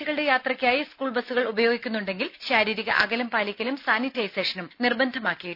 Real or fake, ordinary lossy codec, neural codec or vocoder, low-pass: real; none; none; 5.4 kHz